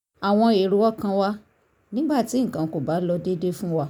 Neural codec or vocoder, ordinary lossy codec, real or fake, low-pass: none; none; real; 19.8 kHz